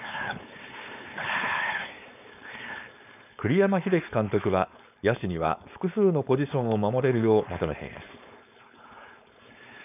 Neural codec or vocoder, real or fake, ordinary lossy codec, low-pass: codec, 16 kHz, 4.8 kbps, FACodec; fake; none; 3.6 kHz